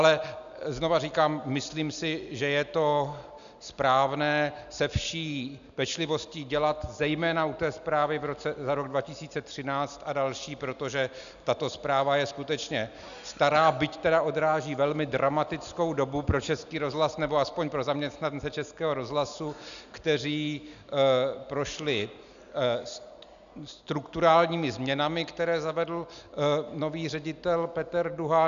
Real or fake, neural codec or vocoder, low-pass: real; none; 7.2 kHz